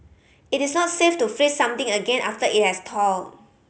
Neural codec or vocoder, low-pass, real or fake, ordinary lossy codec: none; none; real; none